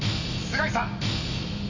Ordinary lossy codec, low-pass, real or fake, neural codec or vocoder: AAC, 48 kbps; 7.2 kHz; real; none